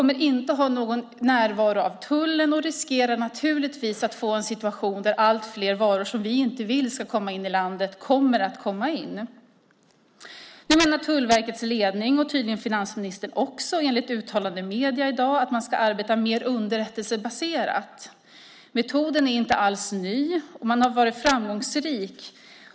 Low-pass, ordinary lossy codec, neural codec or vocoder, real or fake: none; none; none; real